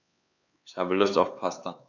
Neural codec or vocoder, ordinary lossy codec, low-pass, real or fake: codec, 16 kHz, 4 kbps, X-Codec, HuBERT features, trained on LibriSpeech; none; 7.2 kHz; fake